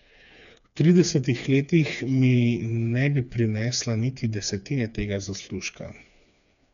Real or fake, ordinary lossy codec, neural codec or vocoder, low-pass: fake; none; codec, 16 kHz, 4 kbps, FreqCodec, smaller model; 7.2 kHz